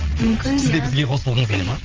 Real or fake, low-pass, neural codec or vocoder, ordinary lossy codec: fake; 7.2 kHz; vocoder, 22.05 kHz, 80 mel bands, WaveNeXt; Opus, 24 kbps